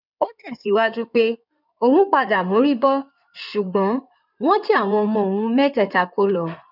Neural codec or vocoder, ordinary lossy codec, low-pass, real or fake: codec, 16 kHz in and 24 kHz out, 2.2 kbps, FireRedTTS-2 codec; none; 5.4 kHz; fake